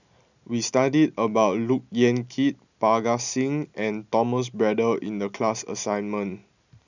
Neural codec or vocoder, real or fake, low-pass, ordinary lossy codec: none; real; 7.2 kHz; none